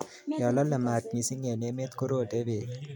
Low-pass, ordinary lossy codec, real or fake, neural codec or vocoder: 19.8 kHz; none; real; none